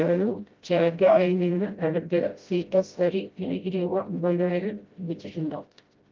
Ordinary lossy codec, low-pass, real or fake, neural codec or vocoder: Opus, 24 kbps; 7.2 kHz; fake; codec, 16 kHz, 0.5 kbps, FreqCodec, smaller model